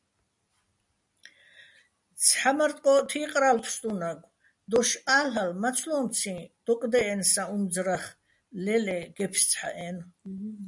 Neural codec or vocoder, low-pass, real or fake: none; 10.8 kHz; real